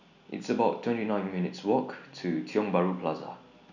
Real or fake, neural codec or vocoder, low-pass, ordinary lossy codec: real; none; 7.2 kHz; none